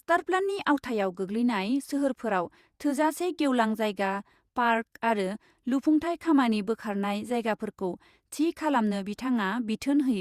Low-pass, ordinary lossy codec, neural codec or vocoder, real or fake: 14.4 kHz; Opus, 64 kbps; vocoder, 48 kHz, 128 mel bands, Vocos; fake